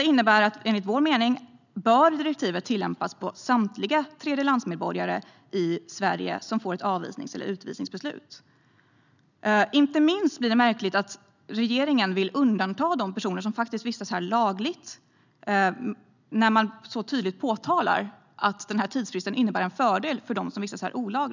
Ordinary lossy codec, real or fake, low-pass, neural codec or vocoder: none; real; 7.2 kHz; none